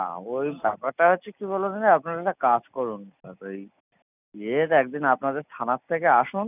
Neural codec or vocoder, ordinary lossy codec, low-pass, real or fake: none; none; 3.6 kHz; real